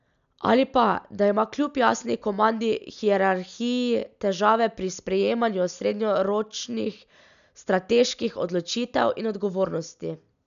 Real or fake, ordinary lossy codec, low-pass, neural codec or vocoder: real; none; 7.2 kHz; none